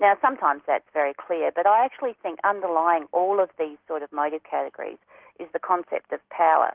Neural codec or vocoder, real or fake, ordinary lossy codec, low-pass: none; real; Opus, 16 kbps; 3.6 kHz